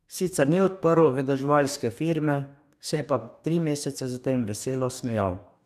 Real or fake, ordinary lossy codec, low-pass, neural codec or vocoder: fake; none; 14.4 kHz; codec, 44.1 kHz, 2.6 kbps, DAC